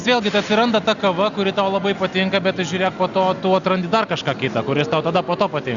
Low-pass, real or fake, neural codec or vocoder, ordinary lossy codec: 7.2 kHz; real; none; Opus, 64 kbps